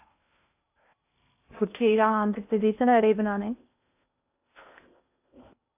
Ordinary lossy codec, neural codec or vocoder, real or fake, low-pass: AAC, 32 kbps; codec, 16 kHz in and 24 kHz out, 0.6 kbps, FocalCodec, streaming, 2048 codes; fake; 3.6 kHz